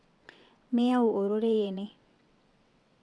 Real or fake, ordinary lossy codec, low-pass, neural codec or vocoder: fake; none; none; vocoder, 22.05 kHz, 80 mel bands, WaveNeXt